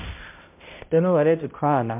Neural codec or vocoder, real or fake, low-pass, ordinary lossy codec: codec, 16 kHz, 0.5 kbps, X-Codec, HuBERT features, trained on balanced general audio; fake; 3.6 kHz; MP3, 32 kbps